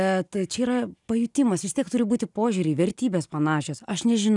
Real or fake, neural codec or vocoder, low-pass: real; none; 10.8 kHz